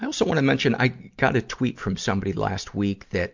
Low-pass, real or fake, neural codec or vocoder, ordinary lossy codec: 7.2 kHz; real; none; MP3, 64 kbps